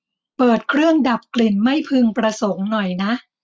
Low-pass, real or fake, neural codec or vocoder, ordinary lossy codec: none; real; none; none